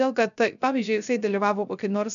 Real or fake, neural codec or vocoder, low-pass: fake; codec, 16 kHz, 0.3 kbps, FocalCodec; 7.2 kHz